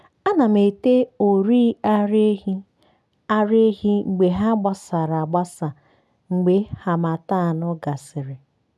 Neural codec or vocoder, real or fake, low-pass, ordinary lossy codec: none; real; none; none